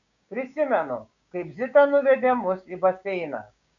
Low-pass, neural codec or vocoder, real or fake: 7.2 kHz; codec, 16 kHz, 6 kbps, DAC; fake